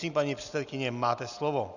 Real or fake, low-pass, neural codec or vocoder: real; 7.2 kHz; none